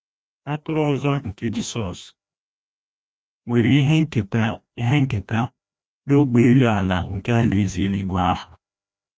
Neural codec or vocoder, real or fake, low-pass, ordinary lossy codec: codec, 16 kHz, 1 kbps, FreqCodec, larger model; fake; none; none